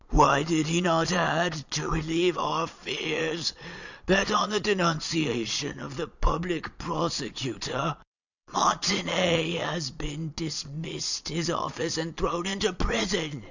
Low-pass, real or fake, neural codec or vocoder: 7.2 kHz; fake; vocoder, 22.05 kHz, 80 mel bands, Vocos